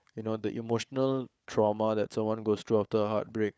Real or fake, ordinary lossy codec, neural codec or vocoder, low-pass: fake; none; codec, 16 kHz, 4 kbps, FunCodec, trained on Chinese and English, 50 frames a second; none